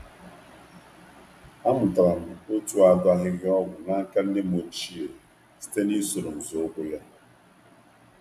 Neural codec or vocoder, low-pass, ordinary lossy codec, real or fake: none; 14.4 kHz; AAC, 96 kbps; real